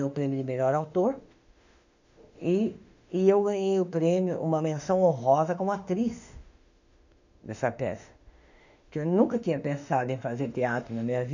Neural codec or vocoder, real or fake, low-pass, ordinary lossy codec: autoencoder, 48 kHz, 32 numbers a frame, DAC-VAE, trained on Japanese speech; fake; 7.2 kHz; none